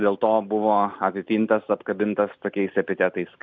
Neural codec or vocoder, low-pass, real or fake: none; 7.2 kHz; real